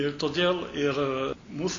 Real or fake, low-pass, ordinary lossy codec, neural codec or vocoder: real; 7.2 kHz; AAC, 32 kbps; none